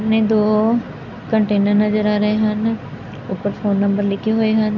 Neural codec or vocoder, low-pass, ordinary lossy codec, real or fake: none; 7.2 kHz; none; real